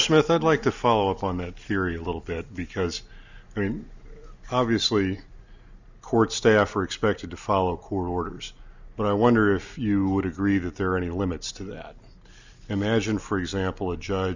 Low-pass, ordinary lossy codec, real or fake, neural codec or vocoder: 7.2 kHz; Opus, 64 kbps; real; none